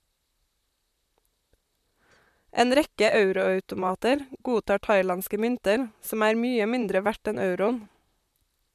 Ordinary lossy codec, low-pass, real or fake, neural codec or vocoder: MP3, 96 kbps; 14.4 kHz; fake; vocoder, 44.1 kHz, 128 mel bands, Pupu-Vocoder